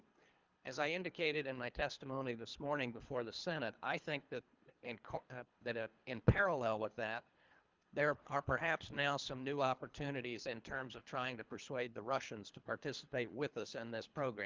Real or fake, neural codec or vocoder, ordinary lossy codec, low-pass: fake; codec, 24 kHz, 3 kbps, HILCodec; Opus, 24 kbps; 7.2 kHz